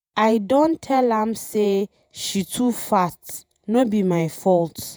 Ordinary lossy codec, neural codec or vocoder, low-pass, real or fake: none; vocoder, 48 kHz, 128 mel bands, Vocos; none; fake